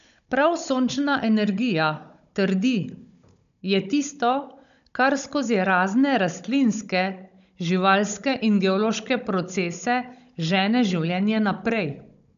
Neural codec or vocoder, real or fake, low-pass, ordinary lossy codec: codec, 16 kHz, 16 kbps, FunCodec, trained on Chinese and English, 50 frames a second; fake; 7.2 kHz; none